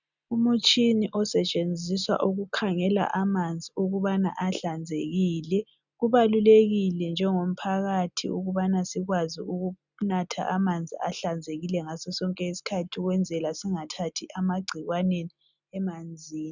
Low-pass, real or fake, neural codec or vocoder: 7.2 kHz; real; none